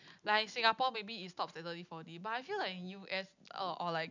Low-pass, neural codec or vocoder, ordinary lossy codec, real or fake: 7.2 kHz; none; none; real